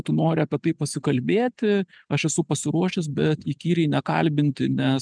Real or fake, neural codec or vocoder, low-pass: real; none; 9.9 kHz